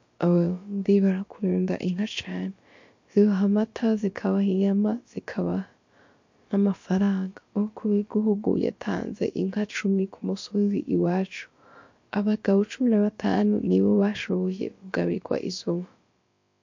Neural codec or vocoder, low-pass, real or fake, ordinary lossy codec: codec, 16 kHz, about 1 kbps, DyCAST, with the encoder's durations; 7.2 kHz; fake; MP3, 48 kbps